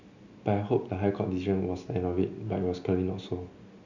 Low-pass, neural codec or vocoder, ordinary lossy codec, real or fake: 7.2 kHz; none; none; real